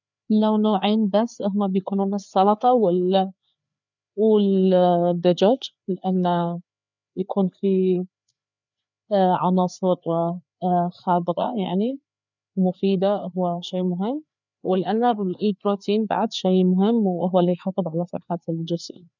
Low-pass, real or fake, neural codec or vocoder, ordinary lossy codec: 7.2 kHz; fake; codec, 16 kHz, 4 kbps, FreqCodec, larger model; none